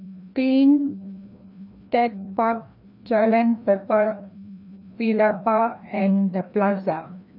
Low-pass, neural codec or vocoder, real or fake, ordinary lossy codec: 5.4 kHz; codec, 16 kHz, 1 kbps, FreqCodec, larger model; fake; none